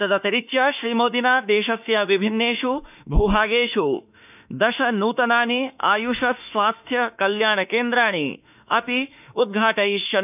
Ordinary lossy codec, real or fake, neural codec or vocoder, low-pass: none; fake; autoencoder, 48 kHz, 32 numbers a frame, DAC-VAE, trained on Japanese speech; 3.6 kHz